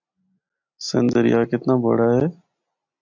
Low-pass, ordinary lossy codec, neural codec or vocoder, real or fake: 7.2 kHz; MP3, 64 kbps; none; real